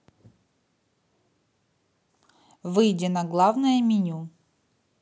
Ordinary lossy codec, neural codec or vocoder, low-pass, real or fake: none; none; none; real